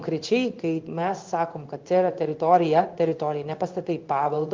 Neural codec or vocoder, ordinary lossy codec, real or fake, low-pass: codec, 16 kHz in and 24 kHz out, 1 kbps, XY-Tokenizer; Opus, 32 kbps; fake; 7.2 kHz